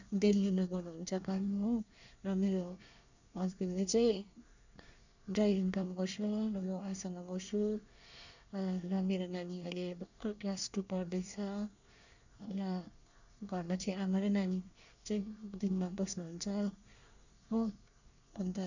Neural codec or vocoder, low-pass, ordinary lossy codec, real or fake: codec, 24 kHz, 1 kbps, SNAC; 7.2 kHz; none; fake